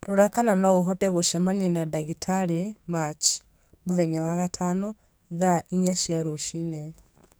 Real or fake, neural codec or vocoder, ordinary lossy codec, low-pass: fake; codec, 44.1 kHz, 2.6 kbps, SNAC; none; none